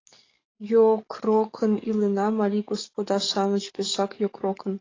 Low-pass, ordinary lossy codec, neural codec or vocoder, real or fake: 7.2 kHz; AAC, 32 kbps; codec, 44.1 kHz, 7.8 kbps, DAC; fake